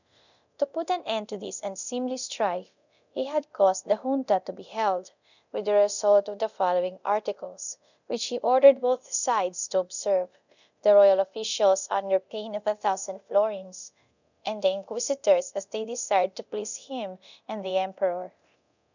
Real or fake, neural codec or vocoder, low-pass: fake; codec, 24 kHz, 0.9 kbps, DualCodec; 7.2 kHz